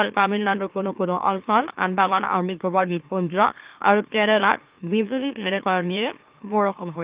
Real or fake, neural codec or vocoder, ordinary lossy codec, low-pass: fake; autoencoder, 44.1 kHz, a latent of 192 numbers a frame, MeloTTS; Opus, 24 kbps; 3.6 kHz